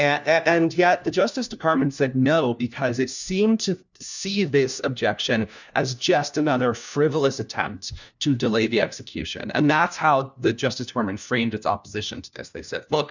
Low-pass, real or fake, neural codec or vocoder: 7.2 kHz; fake; codec, 16 kHz, 1 kbps, FunCodec, trained on LibriTTS, 50 frames a second